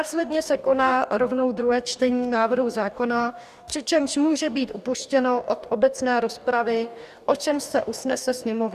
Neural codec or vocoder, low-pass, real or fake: codec, 44.1 kHz, 2.6 kbps, DAC; 14.4 kHz; fake